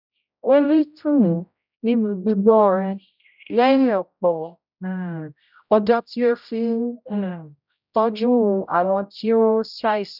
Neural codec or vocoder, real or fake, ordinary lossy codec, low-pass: codec, 16 kHz, 0.5 kbps, X-Codec, HuBERT features, trained on general audio; fake; none; 5.4 kHz